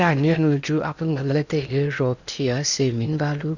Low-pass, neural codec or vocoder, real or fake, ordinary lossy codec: 7.2 kHz; codec, 16 kHz in and 24 kHz out, 0.8 kbps, FocalCodec, streaming, 65536 codes; fake; none